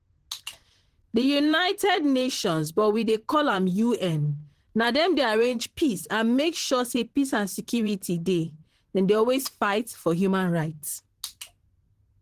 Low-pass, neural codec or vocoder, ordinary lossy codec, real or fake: 14.4 kHz; vocoder, 44.1 kHz, 128 mel bands, Pupu-Vocoder; Opus, 16 kbps; fake